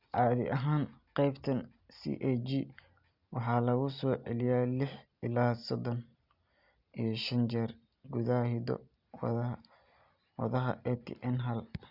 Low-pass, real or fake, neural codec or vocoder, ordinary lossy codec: 5.4 kHz; real; none; Opus, 64 kbps